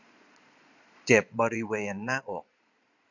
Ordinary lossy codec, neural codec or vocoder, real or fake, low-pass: none; vocoder, 22.05 kHz, 80 mel bands, Vocos; fake; 7.2 kHz